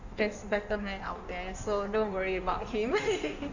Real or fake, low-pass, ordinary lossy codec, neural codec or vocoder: fake; 7.2 kHz; none; codec, 16 kHz in and 24 kHz out, 1.1 kbps, FireRedTTS-2 codec